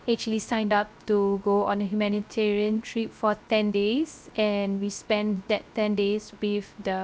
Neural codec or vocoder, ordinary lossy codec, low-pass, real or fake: codec, 16 kHz, 0.3 kbps, FocalCodec; none; none; fake